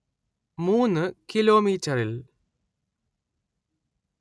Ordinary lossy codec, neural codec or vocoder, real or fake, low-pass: none; none; real; none